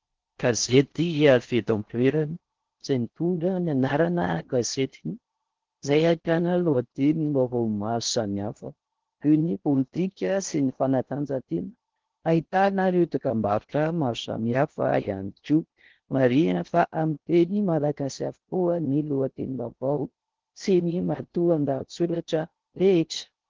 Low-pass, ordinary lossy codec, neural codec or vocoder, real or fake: 7.2 kHz; Opus, 16 kbps; codec, 16 kHz in and 24 kHz out, 0.6 kbps, FocalCodec, streaming, 4096 codes; fake